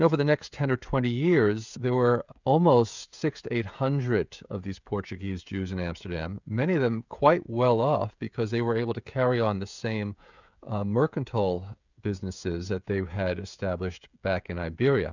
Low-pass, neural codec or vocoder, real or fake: 7.2 kHz; codec, 16 kHz, 16 kbps, FreqCodec, smaller model; fake